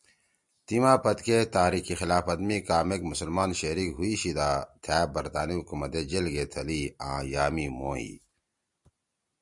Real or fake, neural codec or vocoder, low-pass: real; none; 10.8 kHz